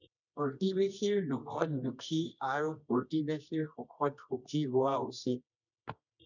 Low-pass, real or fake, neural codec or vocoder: 7.2 kHz; fake; codec, 24 kHz, 0.9 kbps, WavTokenizer, medium music audio release